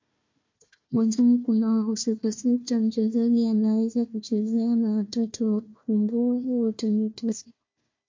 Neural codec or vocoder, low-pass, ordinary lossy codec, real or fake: codec, 16 kHz, 1 kbps, FunCodec, trained on Chinese and English, 50 frames a second; 7.2 kHz; MP3, 48 kbps; fake